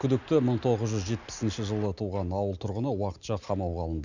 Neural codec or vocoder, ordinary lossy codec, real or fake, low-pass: none; none; real; 7.2 kHz